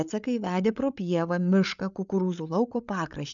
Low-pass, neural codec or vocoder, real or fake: 7.2 kHz; codec, 16 kHz, 8 kbps, FreqCodec, larger model; fake